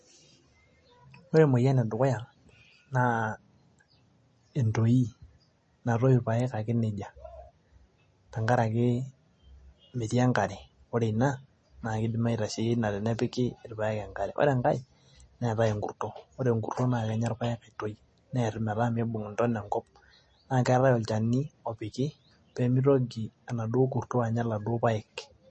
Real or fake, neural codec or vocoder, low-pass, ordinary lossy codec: real; none; 10.8 kHz; MP3, 32 kbps